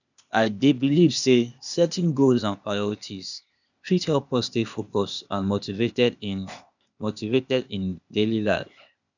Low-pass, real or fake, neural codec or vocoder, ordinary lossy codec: 7.2 kHz; fake; codec, 16 kHz, 0.8 kbps, ZipCodec; none